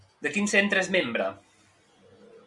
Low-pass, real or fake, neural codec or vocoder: 10.8 kHz; real; none